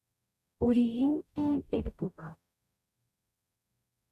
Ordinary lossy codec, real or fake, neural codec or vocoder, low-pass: none; fake; codec, 44.1 kHz, 0.9 kbps, DAC; 14.4 kHz